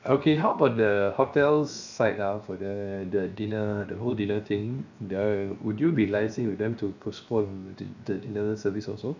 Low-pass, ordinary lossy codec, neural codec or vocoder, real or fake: 7.2 kHz; none; codec, 16 kHz, 0.7 kbps, FocalCodec; fake